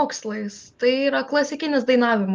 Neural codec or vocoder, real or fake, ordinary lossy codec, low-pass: none; real; Opus, 32 kbps; 7.2 kHz